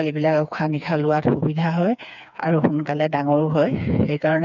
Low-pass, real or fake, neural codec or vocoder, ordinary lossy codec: 7.2 kHz; fake; codec, 16 kHz, 4 kbps, FreqCodec, smaller model; none